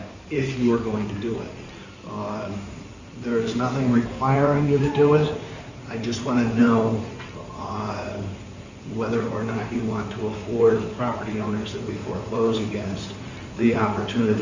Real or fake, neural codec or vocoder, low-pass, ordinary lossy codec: fake; codec, 16 kHz in and 24 kHz out, 2.2 kbps, FireRedTTS-2 codec; 7.2 kHz; Opus, 64 kbps